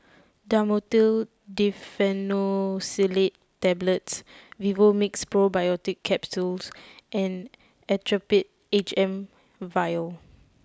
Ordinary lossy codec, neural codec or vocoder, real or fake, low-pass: none; none; real; none